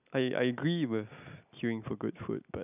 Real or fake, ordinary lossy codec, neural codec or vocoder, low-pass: real; none; none; 3.6 kHz